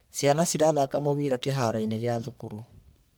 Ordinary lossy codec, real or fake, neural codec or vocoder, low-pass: none; fake; codec, 44.1 kHz, 1.7 kbps, Pupu-Codec; none